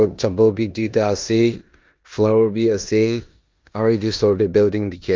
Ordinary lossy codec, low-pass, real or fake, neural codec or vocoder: Opus, 24 kbps; 7.2 kHz; fake; codec, 16 kHz in and 24 kHz out, 0.9 kbps, LongCat-Audio-Codec, four codebook decoder